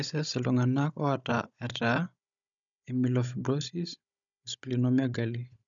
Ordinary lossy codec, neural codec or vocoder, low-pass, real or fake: none; codec, 16 kHz, 16 kbps, FunCodec, trained on Chinese and English, 50 frames a second; 7.2 kHz; fake